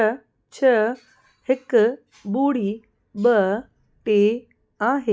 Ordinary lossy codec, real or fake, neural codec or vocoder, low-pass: none; real; none; none